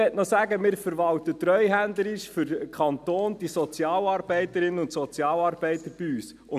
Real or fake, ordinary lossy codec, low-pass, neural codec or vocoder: real; none; 14.4 kHz; none